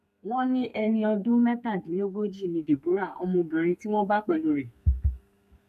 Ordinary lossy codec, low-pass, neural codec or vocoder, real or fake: none; 14.4 kHz; codec, 32 kHz, 1.9 kbps, SNAC; fake